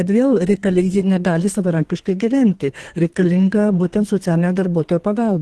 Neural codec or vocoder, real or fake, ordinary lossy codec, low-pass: codec, 32 kHz, 1.9 kbps, SNAC; fake; Opus, 16 kbps; 10.8 kHz